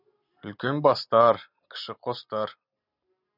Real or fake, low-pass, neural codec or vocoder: real; 5.4 kHz; none